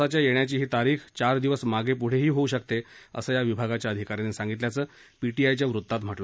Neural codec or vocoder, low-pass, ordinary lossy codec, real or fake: none; none; none; real